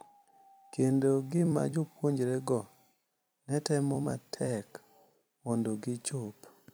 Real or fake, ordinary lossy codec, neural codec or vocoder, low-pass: fake; none; vocoder, 44.1 kHz, 128 mel bands every 256 samples, BigVGAN v2; none